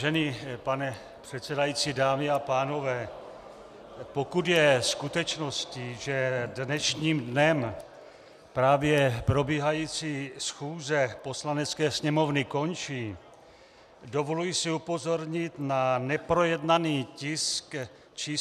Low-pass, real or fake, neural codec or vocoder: 14.4 kHz; real; none